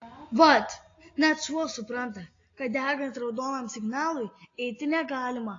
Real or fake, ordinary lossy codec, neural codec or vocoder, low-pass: real; AAC, 32 kbps; none; 7.2 kHz